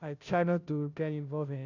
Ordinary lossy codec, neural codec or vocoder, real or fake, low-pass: none; codec, 16 kHz, 0.5 kbps, FunCodec, trained on Chinese and English, 25 frames a second; fake; 7.2 kHz